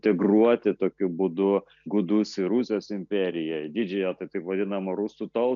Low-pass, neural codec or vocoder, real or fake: 7.2 kHz; none; real